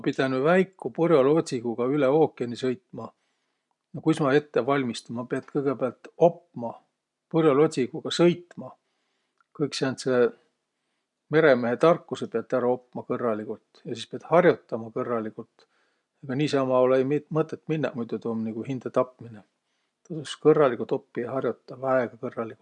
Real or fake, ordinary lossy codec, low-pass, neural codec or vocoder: real; none; 10.8 kHz; none